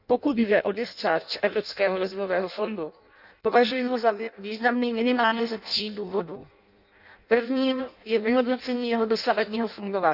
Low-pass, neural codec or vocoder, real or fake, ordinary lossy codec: 5.4 kHz; codec, 16 kHz in and 24 kHz out, 0.6 kbps, FireRedTTS-2 codec; fake; none